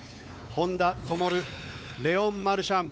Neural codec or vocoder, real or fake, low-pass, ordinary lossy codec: codec, 16 kHz, 4 kbps, X-Codec, WavLM features, trained on Multilingual LibriSpeech; fake; none; none